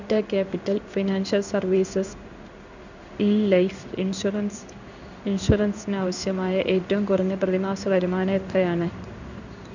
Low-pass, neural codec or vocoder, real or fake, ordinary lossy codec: 7.2 kHz; codec, 16 kHz in and 24 kHz out, 1 kbps, XY-Tokenizer; fake; none